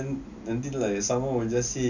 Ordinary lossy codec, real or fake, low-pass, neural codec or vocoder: none; real; 7.2 kHz; none